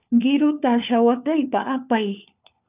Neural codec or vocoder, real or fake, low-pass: codec, 16 kHz, 4 kbps, FunCodec, trained on LibriTTS, 50 frames a second; fake; 3.6 kHz